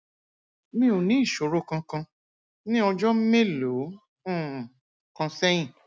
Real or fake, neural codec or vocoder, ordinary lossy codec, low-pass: real; none; none; none